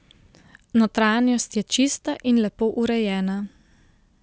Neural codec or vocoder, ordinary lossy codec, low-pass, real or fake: none; none; none; real